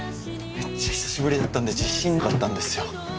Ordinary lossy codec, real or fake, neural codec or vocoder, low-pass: none; real; none; none